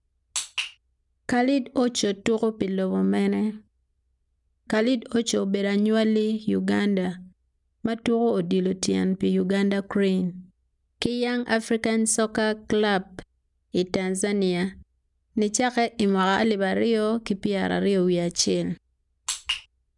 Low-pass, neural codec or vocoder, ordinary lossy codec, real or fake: 10.8 kHz; none; none; real